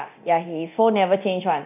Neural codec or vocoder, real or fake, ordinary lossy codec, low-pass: codec, 24 kHz, 0.9 kbps, DualCodec; fake; none; 3.6 kHz